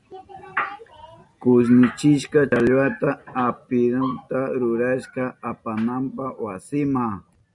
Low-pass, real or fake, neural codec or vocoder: 10.8 kHz; real; none